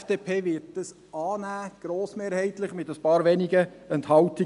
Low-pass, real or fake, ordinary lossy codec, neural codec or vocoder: 10.8 kHz; real; none; none